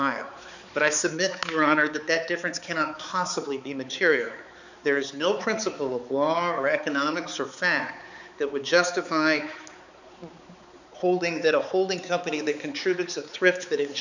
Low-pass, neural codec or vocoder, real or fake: 7.2 kHz; codec, 16 kHz, 4 kbps, X-Codec, HuBERT features, trained on balanced general audio; fake